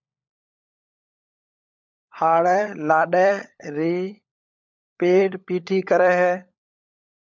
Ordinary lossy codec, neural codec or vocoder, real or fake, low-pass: MP3, 64 kbps; codec, 16 kHz, 16 kbps, FunCodec, trained on LibriTTS, 50 frames a second; fake; 7.2 kHz